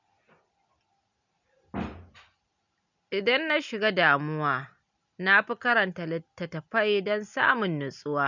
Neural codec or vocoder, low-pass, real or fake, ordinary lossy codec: none; 7.2 kHz; real; none